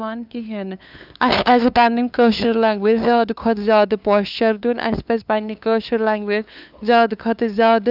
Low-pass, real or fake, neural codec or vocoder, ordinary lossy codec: 5.4 kHz; fake; codec, 16 kHz, 2 kbps, FunCodec, trained on LibriTTS, 25 frames a second; none